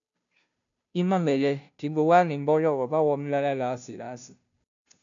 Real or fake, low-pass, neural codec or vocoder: fake; 7.2 kHz; codec, 16 kHz, 0.5 kbps, FunCodec, trained on Chinese and English, 25 frames a second